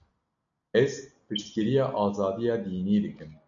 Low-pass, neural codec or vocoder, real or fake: 7.2 kHz; none; real